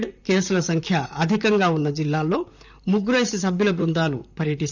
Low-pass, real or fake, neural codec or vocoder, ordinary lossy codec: 7.2 kHz; fake; vocoder, 44.1 kHz, 128 mel bands, Pupu-Vocoder; none